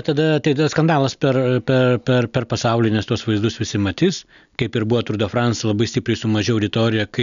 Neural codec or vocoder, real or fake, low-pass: none; real; 7.2 kHz